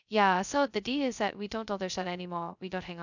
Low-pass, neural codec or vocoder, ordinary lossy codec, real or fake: 7.2 kHz; codec, 16 kHz, 0.2 kbps, FocalCodec; none; fake